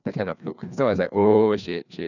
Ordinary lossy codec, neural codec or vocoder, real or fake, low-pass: none; codec, 16 kHz, 2 kbps, FreqCodec, larger model; fake; 7.2 kHz